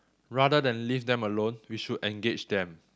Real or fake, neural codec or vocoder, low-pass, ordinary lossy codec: real; none; none; none